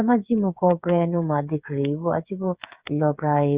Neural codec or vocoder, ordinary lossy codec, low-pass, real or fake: codec, 16 kHz, 8 kbps, FreqCodec, smaller model; Opus, 64 kbps; 3.6 kHz; fake